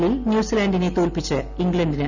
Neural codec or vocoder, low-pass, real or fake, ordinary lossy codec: none; 7.2 kHz; real; none